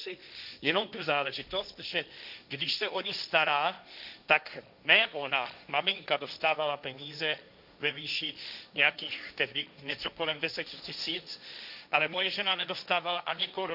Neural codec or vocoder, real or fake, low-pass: codec, 16 kHz, 1.1 kbps, Voila-Tokenizer; fake; 5.4 kHz